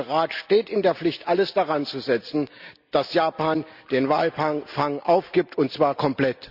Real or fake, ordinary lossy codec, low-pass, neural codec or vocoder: real; Opus, 64 kbps; 5.4 kHz; none